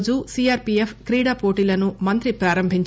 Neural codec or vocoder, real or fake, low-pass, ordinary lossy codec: none; real; none; none